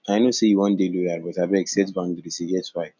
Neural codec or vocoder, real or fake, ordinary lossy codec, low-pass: none; real; none; 7.2 kHz